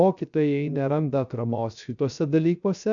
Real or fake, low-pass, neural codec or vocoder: fake; 7.2 kHz; codec, 16 kHz, 0.3 kbps, FocalCodec